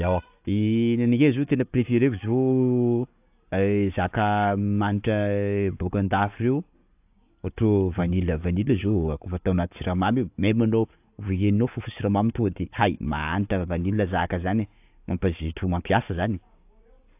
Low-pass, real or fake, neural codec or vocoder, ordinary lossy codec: 3.6 kHz; real; none; AAC, 32 kbps